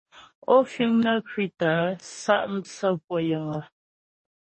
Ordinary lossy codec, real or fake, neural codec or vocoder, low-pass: MP3, 32 kbps; fake; codec, 44.1 kHz, 2.6 kbps, DAC; 10.8 kHz